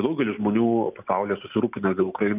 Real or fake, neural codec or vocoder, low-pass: real; none; 3.6 kHz